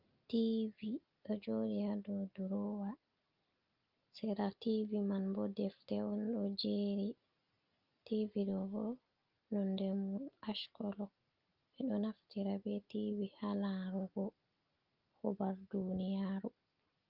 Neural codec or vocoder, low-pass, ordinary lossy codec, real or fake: none; 5.4 kHz; Opus, 32 kbps; real